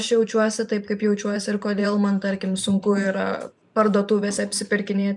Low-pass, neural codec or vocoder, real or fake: 10.8 kHz; vocoder, 44.1 kHz, 128 mel bands every 512 samples, BigVGAN v2; fake